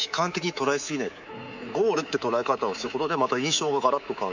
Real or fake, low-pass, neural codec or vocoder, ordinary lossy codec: fake; 7.2 kHz; codec, 24 kHz, 3.1 kbps, DualCodec; none